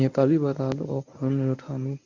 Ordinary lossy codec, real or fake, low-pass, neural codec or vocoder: none; fake; 7.2 kHz; codec, 24 kHz, 0.9 kbps, WavTokenizer, medium speech release version 1